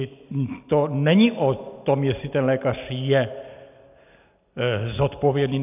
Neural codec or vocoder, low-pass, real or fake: none; 3.6 kHz; real